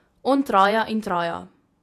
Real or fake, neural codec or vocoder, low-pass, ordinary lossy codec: fake; vocoder, 48 kHz, 128 mel bands, Vocos; 14.4 kHz; none